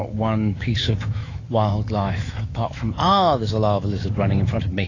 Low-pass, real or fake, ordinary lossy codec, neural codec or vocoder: 7.2 kHz; fake; AAC, 32 kbps; autoencoder, 48 kHz, 128 numbers a frame, DAC-VAE, trained on Japanese speech